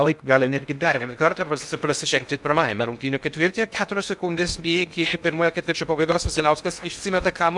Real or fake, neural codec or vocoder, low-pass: fake; codec, 16 kHz in and 24 kHz out, 0.6 kbps, FocalCodec, streaming, 2048 codes; 10.8 kHz